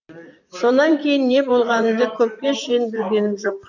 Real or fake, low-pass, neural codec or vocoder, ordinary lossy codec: fake; 7.2 kHz; vocoder, 44.1 kHz, 128 mel bands, Pupu-Vocoder; none